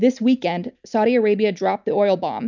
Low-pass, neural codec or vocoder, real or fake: 7.2 kHz; none; real